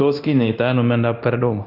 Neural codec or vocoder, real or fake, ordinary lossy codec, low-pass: codec, 24 kHz, 0.9 kbps, DualCodec; fake; AAC, 48 kbps; 5.4 kHz